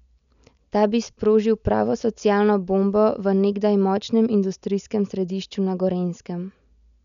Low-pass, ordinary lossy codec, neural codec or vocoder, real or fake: 7.2 kHz; none; none; real